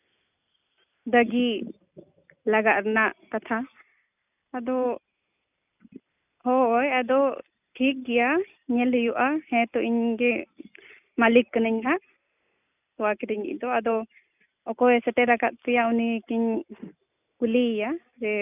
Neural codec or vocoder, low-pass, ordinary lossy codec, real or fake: none; 3.6 kHz; none; real